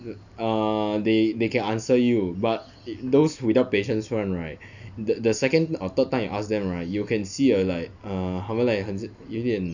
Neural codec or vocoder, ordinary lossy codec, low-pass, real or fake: none; none; 7.2 kHz; real